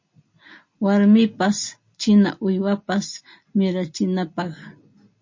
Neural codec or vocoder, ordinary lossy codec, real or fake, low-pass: none; MP3, 32 kbps; real; 7.2 kHz